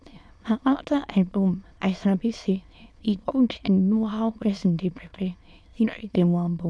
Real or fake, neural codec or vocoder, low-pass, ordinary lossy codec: fake; autoencoder, 22.05 kHz, a latent of 192 numbers a frame, VITS, trained on many speakers; none; none